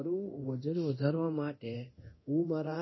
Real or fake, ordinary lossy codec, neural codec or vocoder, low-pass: fake; MP3, 24 kbps; codec, 24 kHz, 0.9 kbps, DualCodec; 7.2 kHz